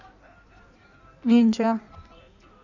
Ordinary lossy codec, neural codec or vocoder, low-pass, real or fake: none; codec, 16 kHz in and 24 kHz out, 1.1 kbps, FireRedTTS-2 codec; 7.2 kHz; fake